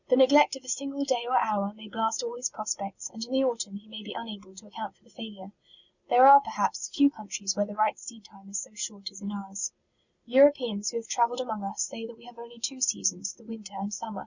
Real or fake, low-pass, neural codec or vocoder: real; 7.2 kHz; none